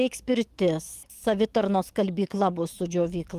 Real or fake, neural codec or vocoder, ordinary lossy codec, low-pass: fake; codec, 44.1 kHz, 7.8 kbps, DAC; Opus, 32 kbps; 14.4 kHz